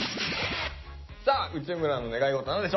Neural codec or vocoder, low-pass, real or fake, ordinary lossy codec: none; 7.2 kHz; real; MP3, 24 kbps